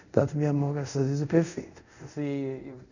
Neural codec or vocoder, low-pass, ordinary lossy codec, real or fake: codec, 24 kHz, 0.5 kbps, DualCodec; 7.2 kHz; none; fake